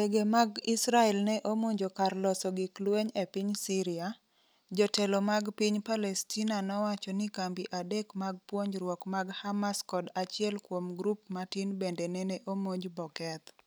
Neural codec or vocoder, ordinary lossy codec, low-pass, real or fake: none; none; none; real